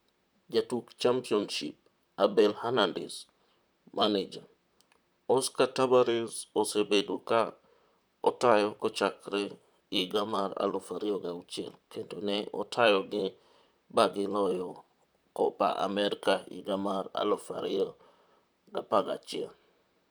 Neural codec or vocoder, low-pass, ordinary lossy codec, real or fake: vocoder, 44.1 kHz, 128 mel bands, Pupu-Vocoder; none; none; fake